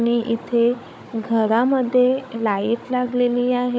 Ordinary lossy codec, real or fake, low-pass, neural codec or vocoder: none; fake; none; codec, 16 kHz, 16 kbps, FunCodec, trained on Chinese and English, 50 frames a second